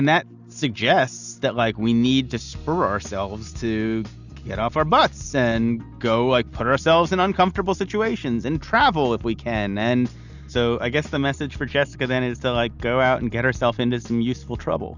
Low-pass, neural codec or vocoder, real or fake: 7.2 kHz; none; real